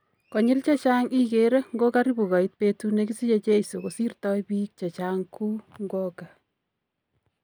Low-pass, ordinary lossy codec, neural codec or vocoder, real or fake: none; none; none; real